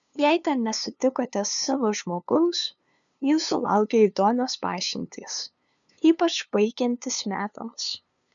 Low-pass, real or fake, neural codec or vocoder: 7.2 kHz; fake; codec, 16 kHz, 2 kbps, FunCodec, trained on LibriTTS, 25 frames a second